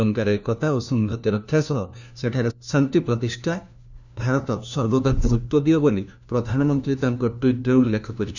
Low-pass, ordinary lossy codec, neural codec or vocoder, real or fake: 7.2 kHz; none; codec, 16 kHz, 1 kbps, FunCodec, trained on LibriTTS, 50 frames a second; fake